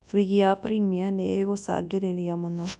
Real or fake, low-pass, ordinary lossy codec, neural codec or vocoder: fake; 10.8 kHz; none; codec, 24 kHz, 0.9 kbps, WavTokenizer, large speech release